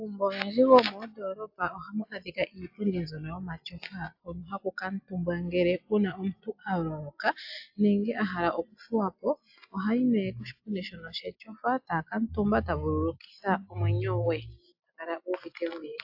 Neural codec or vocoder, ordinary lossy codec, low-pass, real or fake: none; AAC, 48 kbps; 5.4 kHz; real